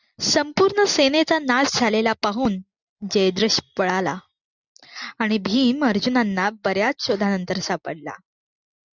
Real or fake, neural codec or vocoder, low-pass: real; none; 7.2 kHz